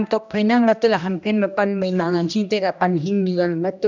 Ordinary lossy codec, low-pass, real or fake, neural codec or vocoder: none; 7.2 kHz; fake; codec, 16 kHz, 1 kbps, X-Codec, HuBERT features, trained on general audio